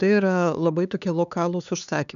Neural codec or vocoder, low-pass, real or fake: codec, 16 kHz, 4 kbps, X-Codec, HuBERT features, trained on LibriSpeech; 7.2 kHz; fake